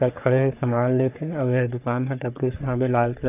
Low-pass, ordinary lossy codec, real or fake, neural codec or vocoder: 3.6 kHz; none; fake; codec, 44.1 kHz, 3.4 kbps, Pupu-Codec